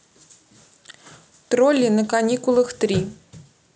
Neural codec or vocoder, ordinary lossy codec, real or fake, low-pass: none; none; real; none